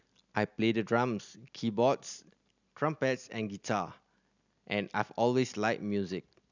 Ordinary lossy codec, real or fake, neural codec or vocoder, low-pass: none; real; none; 7.2 kHz